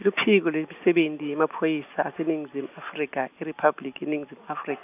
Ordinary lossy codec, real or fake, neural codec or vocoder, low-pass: none; real; none; 3.6 kHz